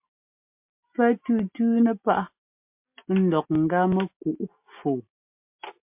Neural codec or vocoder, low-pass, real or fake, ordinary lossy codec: none; 3.6 kHz; real; MP3, 32 kbps